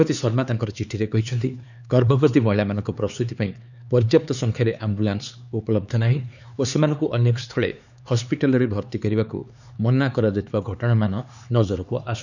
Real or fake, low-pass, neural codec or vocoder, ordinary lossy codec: fake; 7.2 kHz; codec, 16 kHz, 4 kbps, X-Codec, HuBERT features, trained on LibriSpeech; none